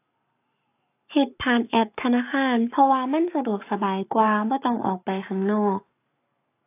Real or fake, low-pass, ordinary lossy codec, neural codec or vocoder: fake; 3.6 kHz; AAC, 24 kbps; codec, 44.1 kHz, 7.8 kbps, Pupu-Codec